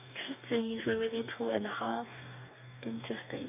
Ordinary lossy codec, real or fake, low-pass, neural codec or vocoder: none; fake; 3.6 kHz; codec, 44.1 kHz, 2.6 kbps, DAC